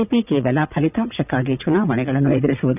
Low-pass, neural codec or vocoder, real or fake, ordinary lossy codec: 3.6 kHz; vocoder, 22.05 kHz, 80 mel bands, WaveNeXt; fake; none